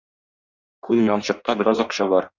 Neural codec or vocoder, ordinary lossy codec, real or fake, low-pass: codec, 16 kHz in and 24 kHz out, 1.1 kbps, FireRedTTS-2 codec; Opus, 64 kbps; fake; 7.2 kHz